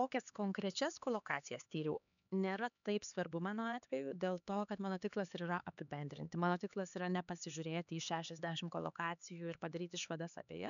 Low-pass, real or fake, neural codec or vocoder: 7.2 kHz; fake; codec, 16 kHz, 4 kbps, X-Codec, HuBERT features, trained on LibriSpeech